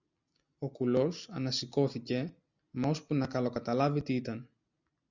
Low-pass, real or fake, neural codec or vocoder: 7.2 kHz; real; none